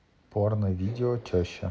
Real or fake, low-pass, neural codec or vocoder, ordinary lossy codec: real; none; none; none